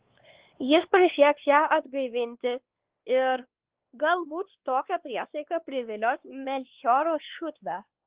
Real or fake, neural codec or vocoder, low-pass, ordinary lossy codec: fake; codec, 16 kHz, 2 kbps, X-Codec, WavLM features, trained on Multilingual LibriSpeech; 3.6 kHz; Opus, 16 kbps